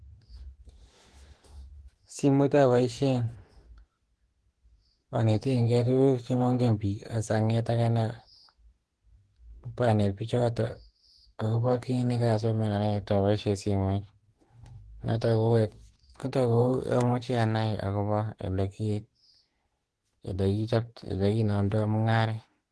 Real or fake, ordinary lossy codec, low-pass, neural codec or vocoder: fake; Opus, 16 kbps; 10.8 kHz; autoencoder, 48 kHz, 32 numbers a frame, DAC-VAE, trained on Japanese speech